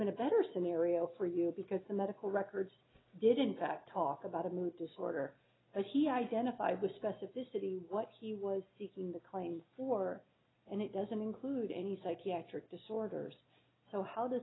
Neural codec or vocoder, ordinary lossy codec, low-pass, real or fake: none; AAC, 16 kbps; 7.2 kHz; real